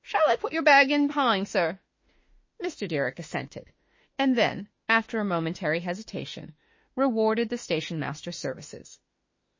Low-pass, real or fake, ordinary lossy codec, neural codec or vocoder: 7.2 kHz; fake; MP3, 32 kbps; autoencoder, 48 kHz, 32 numbers a frame, DAC-VAE, trained on Japanese speech